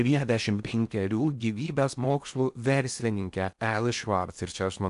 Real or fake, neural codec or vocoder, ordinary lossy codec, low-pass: fake; codec, 16 kHz in and 24 kHz out, 0.6 kbps, FocalCodec, streaming, 4096 codes; AAC, 96 kbps; 10.8 kHz